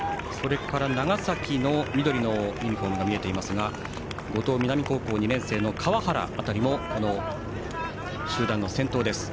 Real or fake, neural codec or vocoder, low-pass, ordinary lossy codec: real; none; none; none